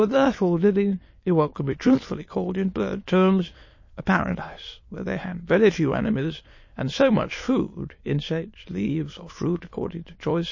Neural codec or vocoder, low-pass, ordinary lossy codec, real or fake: autoencoder, 22.05 kHz, a latent of 192 numbers a frame, VITS, trained on many speakers; 7.2 kHz; MP3, 32 kbps; fake